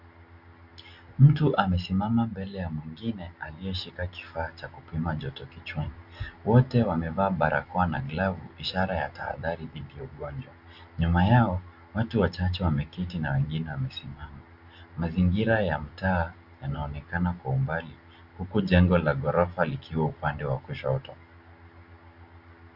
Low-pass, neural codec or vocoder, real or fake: 5.4 kHz; none; real